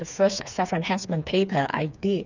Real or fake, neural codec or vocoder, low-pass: fake; codec, 16 kHz, 4 kbps, FreqCodec, smaller model; 7.2 kHz